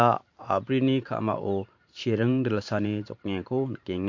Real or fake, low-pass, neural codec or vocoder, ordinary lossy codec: fake; 7.2 kHz; vocoder, 44.1 kHz, 128 mel bands every 512 samples, BigVGAN v2; MP3, 48 kbps